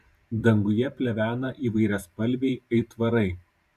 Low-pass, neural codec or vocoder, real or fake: 14.4 kHz; vocoder, 44.1 kHz, 128 mel bands every 512 samples, BigVGAN v2; fake